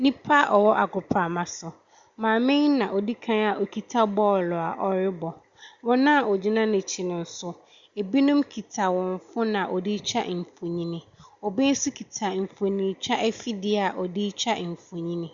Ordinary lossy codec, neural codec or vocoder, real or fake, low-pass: Opus, 64 kbps; none; real; 7.2 kHz